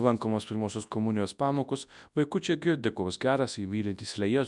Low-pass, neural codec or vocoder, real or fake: 10.8 kHz; codec, 24 kHz, 0.9 kbps, WavTokenizer, large speech release; fake